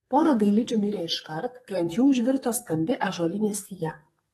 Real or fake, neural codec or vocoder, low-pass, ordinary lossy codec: fake; codec, 32 kHz, 1.9 kbps, SNAC; 14.4 kHz; AAC, 32 kbps